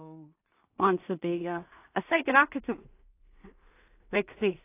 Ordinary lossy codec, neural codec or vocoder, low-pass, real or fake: AAC, 24 kbps; codec, 16 kHz in and 24 kHz out, 0.4 kbps, LongCat-Audio-Codec, two codebook decoder; 3.6 kHz; fake